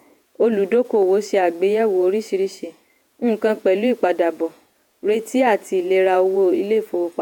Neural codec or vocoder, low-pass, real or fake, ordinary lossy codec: vocoder, 48 kHz, 128 mel bands, Vocos; 19.8 kHz; fake; none